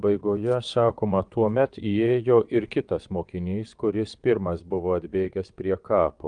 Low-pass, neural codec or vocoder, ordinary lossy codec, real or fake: 9.9 kHz; vocoder, 22.05 kHz, 80 mel bands, WaveNeXt; Opus, 32 kbps; fake